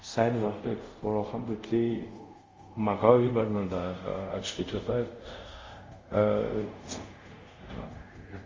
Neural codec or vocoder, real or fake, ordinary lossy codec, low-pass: codec, 24 kHz, 0.5 kbps, DualCodec; fake; Opus, 32 kbps; 7.2 kHz